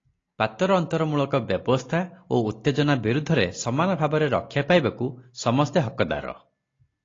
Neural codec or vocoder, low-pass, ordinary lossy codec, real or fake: none; 7.2 kHz; AAC, 32 kbps; real